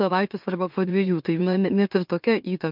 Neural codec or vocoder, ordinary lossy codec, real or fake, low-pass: autoencoder, 44.1 kHz, a latent of 192 numbers a frame, MeloTTS; MP3, 48 kbps; fake; 5.4 kHz